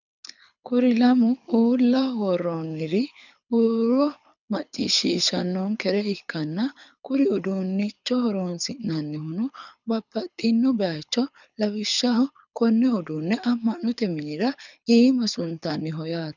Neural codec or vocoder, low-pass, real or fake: codec, 24 kHz, 6 kbps, HILCodec; 7.2 kHz; fake